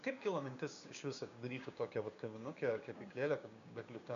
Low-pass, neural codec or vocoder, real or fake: 7.2 kHz; codec, 16 kHz, 6 kbps, DAC; fake